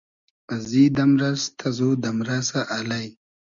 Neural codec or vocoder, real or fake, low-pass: none; real; 7.2 kHz